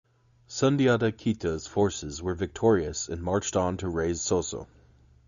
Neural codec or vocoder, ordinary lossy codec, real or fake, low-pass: none; Opus, 64 kbps; real; 7.2 kHz